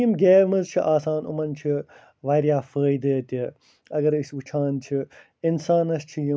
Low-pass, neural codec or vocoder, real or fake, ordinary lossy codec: none; none; real; none